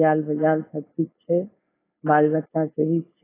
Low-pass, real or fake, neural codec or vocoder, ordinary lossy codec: 3.6 kHz; fake; codec, 16 kHz, 4.8 kbps, FACodec; AAC, 16 kbps